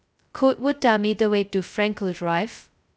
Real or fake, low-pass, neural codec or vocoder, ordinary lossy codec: fake; none; codec, 16 kHz, 0.2 kbps, FocalCodec; none